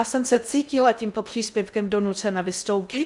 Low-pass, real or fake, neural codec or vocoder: 10.8 kHz; fake; codec, 16 kHz in and 24 kHz out, 0.6 kbps, FocalCodec, streaming, 2048 codes